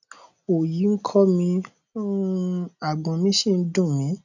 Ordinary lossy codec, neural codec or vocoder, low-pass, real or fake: none; none; 7.2 kHz; real